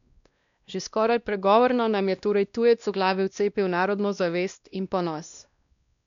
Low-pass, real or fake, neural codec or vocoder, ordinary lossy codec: 7.2 kHz; fake; codec, 16 kHz, 1 kbps, X-Codec, WavLM features, trained on Multilingual LibriSpeech; none